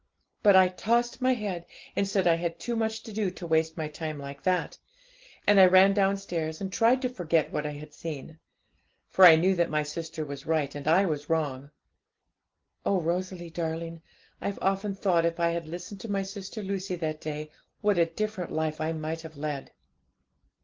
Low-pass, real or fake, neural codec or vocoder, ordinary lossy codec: 7.2 kHz; real; none; Opus, 16 kbps